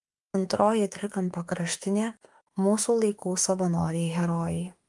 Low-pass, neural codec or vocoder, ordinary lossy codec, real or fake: 10.8 kHz; autoencoder, 48 kHz, 32 numbers a frame, DAC-VAE, trained on Japanese speech; Opus, 32 kbps; fake